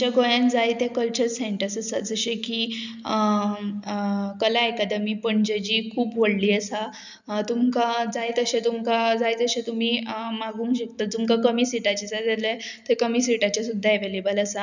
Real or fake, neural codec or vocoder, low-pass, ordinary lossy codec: real; none; 7.2 kHz; none